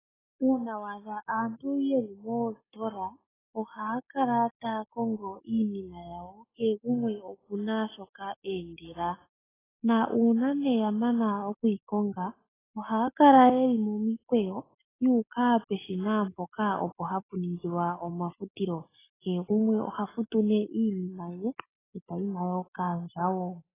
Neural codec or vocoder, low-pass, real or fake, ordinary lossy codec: none; 3.6 kHz; real; AAC, 16 kbps